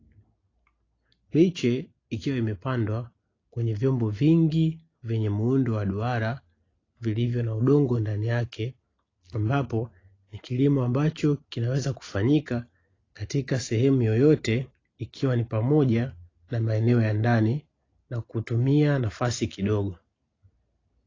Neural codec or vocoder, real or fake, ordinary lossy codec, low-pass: none; real; AAC, 32 kbps; 7.2 kHz